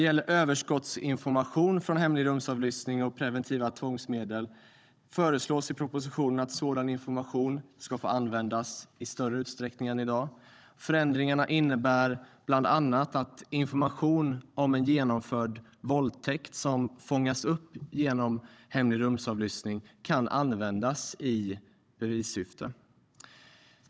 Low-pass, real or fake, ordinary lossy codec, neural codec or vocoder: none; fake; none; codec, 16 kHz, 16 kbps, FunCodec, trained on Chinese and English, 50 frames a second